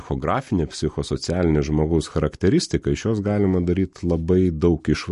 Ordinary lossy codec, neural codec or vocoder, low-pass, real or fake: MP3, 48 kbps; none; 14.4 kHz; real